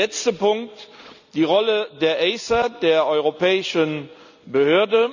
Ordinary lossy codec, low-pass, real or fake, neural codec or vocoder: none; 7.2 kHz; real; none